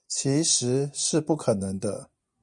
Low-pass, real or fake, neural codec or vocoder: 10.8 kHz; fake; vocoder, 24 kHz, 100 mel bands, Vocos